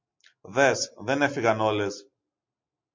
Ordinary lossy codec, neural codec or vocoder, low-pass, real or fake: MP3, 48 kbps; none; 7.2 kHz; real